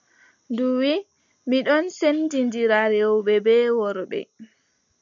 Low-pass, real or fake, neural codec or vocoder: 7.2 kHz; real; none